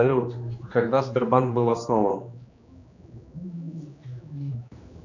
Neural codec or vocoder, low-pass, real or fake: codec, 16 kHz, 2 kbps, X-Codec, HuBERT features, trained on general audio; 7.2 kHz; fake